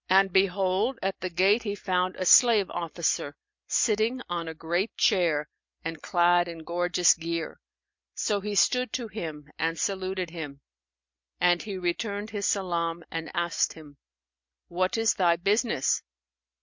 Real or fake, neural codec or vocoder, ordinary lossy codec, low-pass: real; none; MP3, 48 kbps; 7.2 kHz